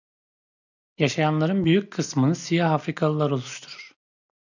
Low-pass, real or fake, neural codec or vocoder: 7.2 kHz; real; none